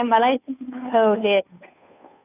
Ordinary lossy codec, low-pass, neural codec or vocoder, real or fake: none; 3.6 kHz; codec, 16 kHz, 2 kbps, FunCodec, trained on Chinese and English, 25 frames a second; fake